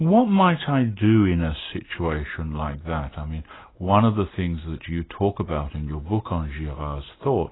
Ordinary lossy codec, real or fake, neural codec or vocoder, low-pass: AAC, 16 kbps; real; none; 7.2 kHz